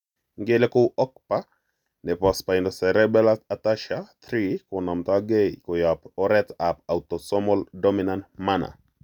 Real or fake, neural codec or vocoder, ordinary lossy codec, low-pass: real; none; none; 19.8 kHz